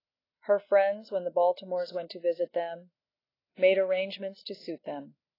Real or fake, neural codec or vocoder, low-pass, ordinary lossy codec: real; none; 5.4 kHz; AAC, 24 kbps